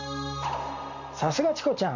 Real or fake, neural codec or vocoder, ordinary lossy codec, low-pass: real; none; none; 7.2 kHz